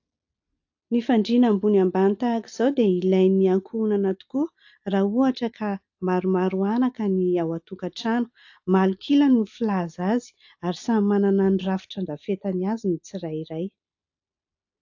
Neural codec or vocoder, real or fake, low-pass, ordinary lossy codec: none; real; 7.2 kHz; AAC, 48 kbps